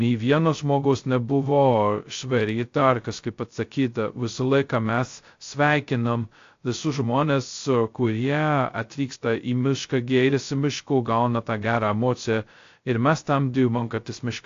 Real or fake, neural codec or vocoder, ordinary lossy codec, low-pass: fake; codec, 16 kHz, 0.2 kbps, FocalCodec; AAC, 48 kbps; 7.2 kHz